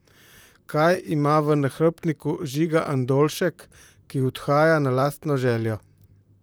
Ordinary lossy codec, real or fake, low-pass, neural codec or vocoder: none; fake; none; vocoder, 44.1 kHz, 128 mel bands, Pupu-Vocoder